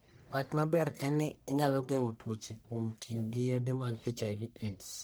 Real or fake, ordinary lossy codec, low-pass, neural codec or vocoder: fake; none; none; codec, 44.1 kHz, 1.7 kbps, Pupu-Codec